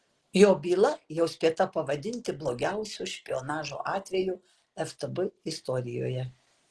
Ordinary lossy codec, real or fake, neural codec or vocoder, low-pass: Opus, 16 kbps; real; none; 10.8 kHz